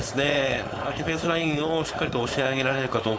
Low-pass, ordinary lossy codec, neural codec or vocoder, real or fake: none; none; codec, 16 kHz, 4.8 kbps, FACodec; fake